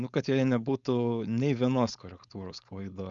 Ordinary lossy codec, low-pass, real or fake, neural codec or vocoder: Opus, 64 kbps; 7.2 kHz; fake; codec, 16 kHz, 4.8 kbps, FACodec